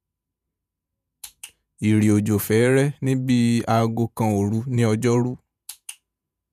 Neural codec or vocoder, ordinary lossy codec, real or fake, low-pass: vocoder, 48 kHz, 128 mel bands, Vocos; none; fake; 14.4 kHz